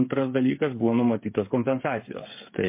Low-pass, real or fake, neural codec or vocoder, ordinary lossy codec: 3.6 kHz; fake; codec, 16 kHz, 8 kbps, FreqCodec, smaller model; MP3, 24 kbps